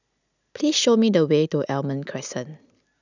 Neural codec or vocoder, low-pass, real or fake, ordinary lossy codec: none; 7.2 kHz; real; none